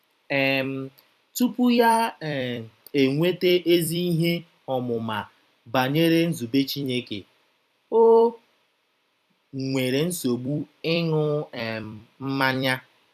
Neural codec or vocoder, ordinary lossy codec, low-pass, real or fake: vocoder, 44.1 kHz, 128 mel bands every 256 samples, BigVGAN v2; none; 14.4 kHz; fake